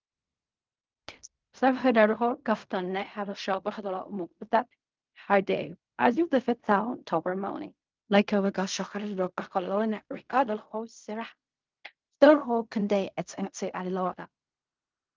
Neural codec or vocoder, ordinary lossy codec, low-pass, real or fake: codec, 16 kHz in and 24 kHz out, 0.4 kbps, LongCat-Audio-Codec, fine tuned four codebook decoder; Opus, 24 kbps; 7.2 kHz; fake